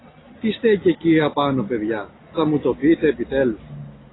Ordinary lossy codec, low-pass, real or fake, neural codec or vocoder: AAC, 16 kbps; 7.2 kHz; real; none